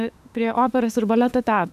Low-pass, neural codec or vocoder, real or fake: 14.4 kHz; autoencoder, 48 kHz, 32 numbers a frame, DAC-VAE, trained on Japanese speech; fake